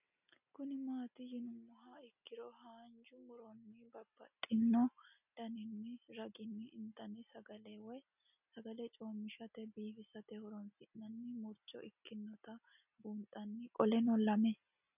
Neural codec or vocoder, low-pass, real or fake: none; 3.6 kHz; real